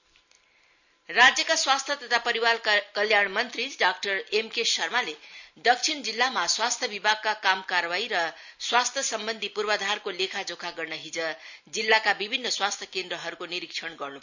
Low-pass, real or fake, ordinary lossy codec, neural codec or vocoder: 7.2 kHz; real; none; none